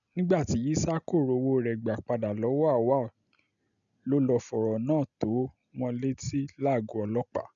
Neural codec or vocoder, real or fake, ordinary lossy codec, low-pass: none; real; none; 7.2 kHz